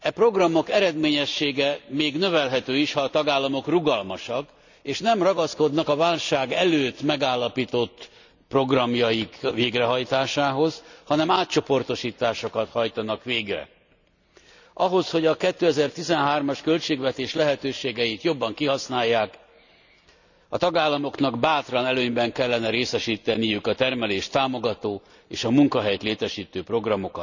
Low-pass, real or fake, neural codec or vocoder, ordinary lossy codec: 7.2 kHz; real; none; none